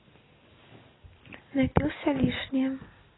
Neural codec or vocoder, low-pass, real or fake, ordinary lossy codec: none; 7.2 kHz; real; AAC, 16 kbps